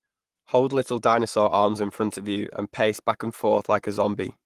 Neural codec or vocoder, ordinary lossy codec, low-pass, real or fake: codec, 44.1 kHz, 7.8 kbps, Pupu-Codec; Opus, 24 kbps; 14.4 kHz; fake